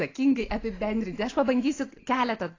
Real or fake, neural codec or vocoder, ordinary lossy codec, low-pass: real; none; AAC, 32 kbps; 7.2 kHz